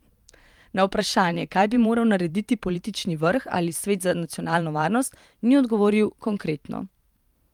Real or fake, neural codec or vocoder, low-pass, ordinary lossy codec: fake; vocoder, 44.1 kHz, 128 mel bands every 512 samples, BigVGAN v2; 19.8 kHz; Opus, 32 kbps